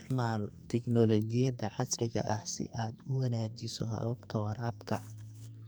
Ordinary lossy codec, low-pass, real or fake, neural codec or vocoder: none; none; fake; codec, 44.1 kHz, 2.6 kbps, SNAC